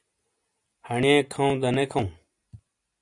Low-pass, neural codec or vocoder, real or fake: 10.8 kHz; none; real